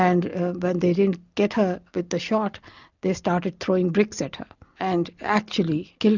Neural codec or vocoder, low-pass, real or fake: none; 7.2 kHz; real